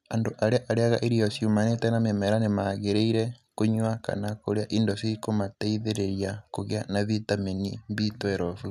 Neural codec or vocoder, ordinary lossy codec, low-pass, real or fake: none; none; 14.4 kHz; real